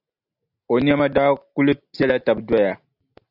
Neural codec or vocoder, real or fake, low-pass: none; real; 5.4 kHz